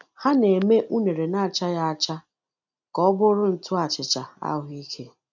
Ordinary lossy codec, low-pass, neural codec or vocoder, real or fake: none; 7.2 kHz; none; real